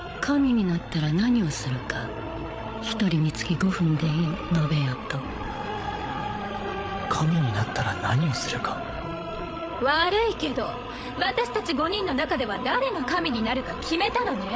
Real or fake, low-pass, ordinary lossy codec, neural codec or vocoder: fake; none; none; codec, 16 kHz, 8 kbps, FreqCodec, larger model